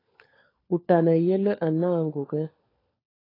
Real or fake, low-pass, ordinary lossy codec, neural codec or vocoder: fake; 5.4 kHz; AAC, 24 kbps; codec, 16 kHz, 4 kbps, FunCodec, trained on LibriTTS, 50 frames a second